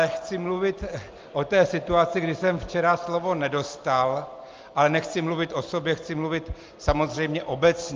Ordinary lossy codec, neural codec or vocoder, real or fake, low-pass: Opus, 32 kbps; none; real; 7.2 kHz